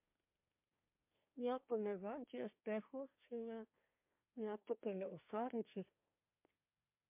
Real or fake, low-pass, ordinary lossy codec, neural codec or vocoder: fake; 3.6 kHz; MP3, 32 kbps; codec, 24 kHz, 1 kbps, SNAC